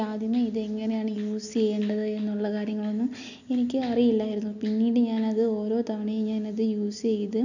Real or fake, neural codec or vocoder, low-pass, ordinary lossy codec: real; none; 7.2 kHz; none